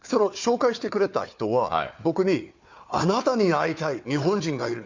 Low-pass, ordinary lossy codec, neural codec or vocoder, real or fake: 7.2 kHz; MP3, 64 kbps; codec, 16 kHz, 4 kbps, FunCodec, trained on Chinese and English, 50 frames a second; fake